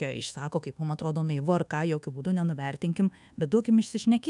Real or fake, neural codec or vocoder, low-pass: fake; codec, 24 kHz, 1.2 kbps, DualCodec; 10.8 kHz